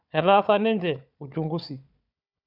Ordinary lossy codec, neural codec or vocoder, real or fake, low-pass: none; codec, 16 kHz, 4 kbps, FunCodec, trained on Chinese and English, 50 frames a second; fake; 5.4 kHz